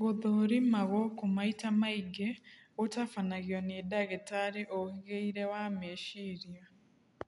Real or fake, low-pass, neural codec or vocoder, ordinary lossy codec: real; 10.8 kHz; none; none